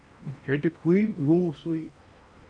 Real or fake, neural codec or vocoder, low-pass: fake; codec, 16 kHz in and 24 kHz out, 0.8 kbps, FocalCodec, streaming, 65536 codes; 9.9 kHz